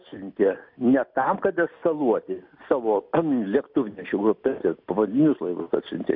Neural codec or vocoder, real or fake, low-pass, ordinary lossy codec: none; real; 5.4 kHz; MP3, 48 kbps